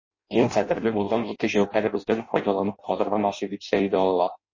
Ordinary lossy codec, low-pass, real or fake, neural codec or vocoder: MP3, 32 kbps; 7.2 kHz; fake; codec, 16 kHz in and 24 kHz out, 0.6 kbps, FireRedTTS-2 codec